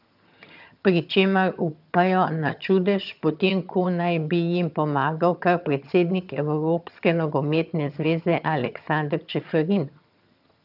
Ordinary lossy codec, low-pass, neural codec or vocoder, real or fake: none; 5.4 kHz; vocoder, 22.05 kHz, 80 mel bands, HiFi-GAN; fake